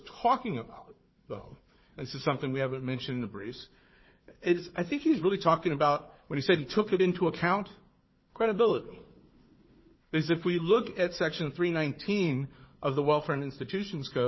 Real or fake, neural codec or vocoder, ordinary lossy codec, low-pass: fake; codec, 16 kHz, 4 kbps, FunCodec, trained on Chinese and English, 50 frames a second; MP3, 24 kbps; 7.2 kHz